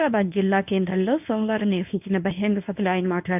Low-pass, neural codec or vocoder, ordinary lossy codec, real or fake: 3.6 kHz; codec, 24 kHz, 0.9 kbps, WavTokenizer, medium speech release version 1; AAC, 32 kbps; fake